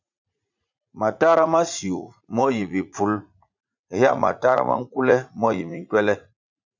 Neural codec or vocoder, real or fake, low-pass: vocoder, 44.1 kHz, 80 mel bands, Vocos; fake; 7.2 kHz